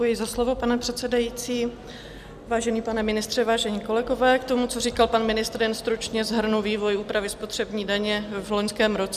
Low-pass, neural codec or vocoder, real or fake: 14.4 kHz; none; real